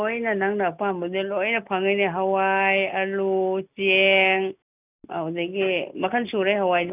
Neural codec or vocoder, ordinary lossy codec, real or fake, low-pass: none; none; real; 3.6 kHz